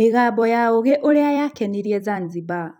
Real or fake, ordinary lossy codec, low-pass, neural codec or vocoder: fake; none; 19.8 kHz; vocoder, 44.1 kHz, 128 mel bands every 256 samples, BigVGAN v2